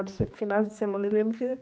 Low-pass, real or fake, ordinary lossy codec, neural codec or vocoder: none; fake; none; codec, 16 kHz, 2 kbps, X-Codec, HuBERT features, trained on general audio